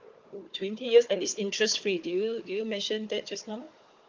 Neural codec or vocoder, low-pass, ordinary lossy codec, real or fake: codec, 16 kHz, 4 kbps, FunCodec, trained on Chinese and English, 50 frames a second; 7.2 kHz; Opus, 24 kbps; fake